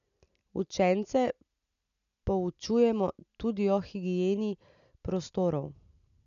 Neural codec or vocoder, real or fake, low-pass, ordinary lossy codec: none; real; 7.2 kHz; none